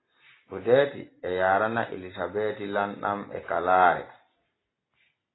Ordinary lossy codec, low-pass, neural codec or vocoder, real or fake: AAC, 16 kbps; 7.2 kHz; none; real